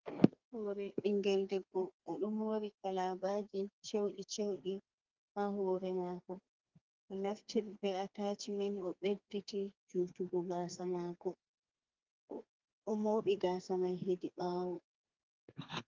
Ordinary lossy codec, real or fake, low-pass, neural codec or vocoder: Opus, 32 kbps; fake; 7.2 kHz; codec, 32 kHz, 1.9 kbps, SNAC